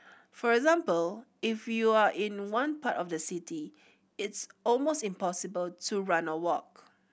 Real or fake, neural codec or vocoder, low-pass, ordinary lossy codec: real; none; none; none